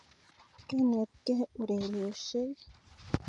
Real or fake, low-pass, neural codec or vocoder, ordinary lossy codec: real; 10.8 kHz; none; none